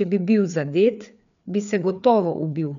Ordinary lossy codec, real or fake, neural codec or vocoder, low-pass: none; fake; codec, 16 kHz, 4 kbps, FreqCodec, larger model; 7.2 kHz